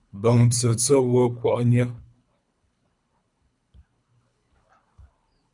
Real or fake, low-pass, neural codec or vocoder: fake; 10.8 kHz; codec, 24 kHz, 3 kbps, HILCodec